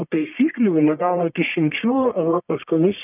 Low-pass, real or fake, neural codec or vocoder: 3.6 kHz; fake; codec, 44.1 kHz, 1.7 kbps, Pupu-Codec